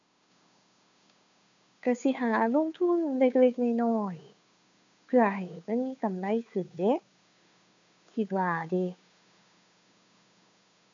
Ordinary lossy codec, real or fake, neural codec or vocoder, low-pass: AAC, 64 kbps; fake; codec, 16 kHz, 2 kbps, FunCodec, trained on Chinese and English, 25 frames a second; 7.2 kHz